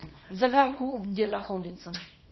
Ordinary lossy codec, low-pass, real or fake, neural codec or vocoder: MP3, 24 kbps; 7.2 kHz; fake; codec, 24 kHz, 0.9 kbps, WavTokenizer, small release